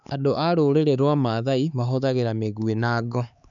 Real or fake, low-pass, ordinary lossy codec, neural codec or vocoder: fake; 7.2 kHz; none; codec, 16 kHz, 4 kbps, X-Codec, HuBERT features, trained on LibriSpeech